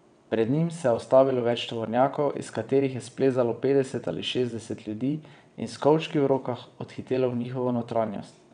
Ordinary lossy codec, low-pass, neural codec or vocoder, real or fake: none; 9.9 kHz; vocoder, 22.05 kHz, 80 mel bands, WaveNeXt; fake